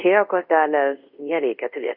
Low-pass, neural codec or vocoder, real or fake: 5.4 kHz; codec, 24 kHz, 0.5 kbps, DualCodec; fake